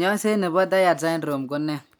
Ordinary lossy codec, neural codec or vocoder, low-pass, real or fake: none; none; none; real